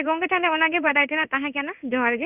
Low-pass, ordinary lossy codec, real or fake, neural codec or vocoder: 3.6 kHz; none; fake; codec, 16 kHz in and 24 kHz out, 1 kbps, XY-Tokenizer